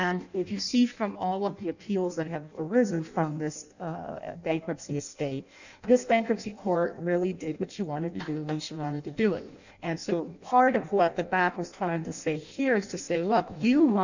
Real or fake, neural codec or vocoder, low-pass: fake; codec, 16 kHz in and 24 kHz out, 0.6 kbps, FireRedTTS-2 codec; 7.2 kHz